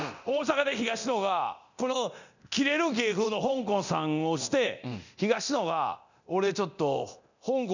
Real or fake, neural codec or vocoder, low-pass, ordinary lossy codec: fake; codec, 24 kHz, 0.9 kbps, DualCodec; 7.2 kHz; none